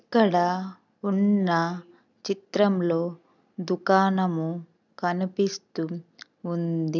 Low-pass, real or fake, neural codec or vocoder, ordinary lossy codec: 7.2 kHz; real; none; none